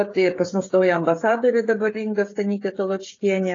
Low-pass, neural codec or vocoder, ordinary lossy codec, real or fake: 7.2 kHz; codec, 16 kHz, 16 kbps, FreqCodec, smaller model; AAC, 32 kbps; fake